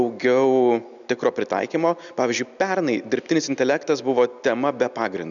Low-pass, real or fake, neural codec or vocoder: 7.2 kHz; real; none